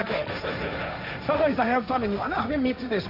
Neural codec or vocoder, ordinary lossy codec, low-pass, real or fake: codec, 16 kHz, 1.1 kbps, Voila-Tokenizer; none; 5.4 kHz; fake